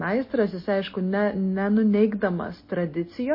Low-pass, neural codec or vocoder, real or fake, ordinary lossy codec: 5.4 kHz; none; real; MP3, 24 kbps